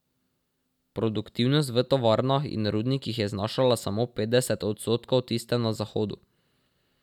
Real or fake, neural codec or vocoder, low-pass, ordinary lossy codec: real; none; 19.8 kHz; none